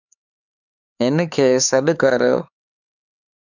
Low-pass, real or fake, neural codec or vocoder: 7.2 kHz; fake; codec, 16 kHz, 4 kbps, X-Codec, HuBERT features, trained on LibriSpeech